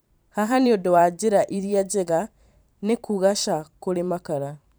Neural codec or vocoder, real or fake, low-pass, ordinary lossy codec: vocoder, 44.1 kHz, 128 mel bands every 512 samples, BigVGAN v2; fake; none; none